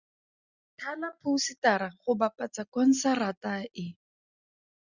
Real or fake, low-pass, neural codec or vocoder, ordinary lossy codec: real; 7.2 kHz; none; Opus, 64 kbps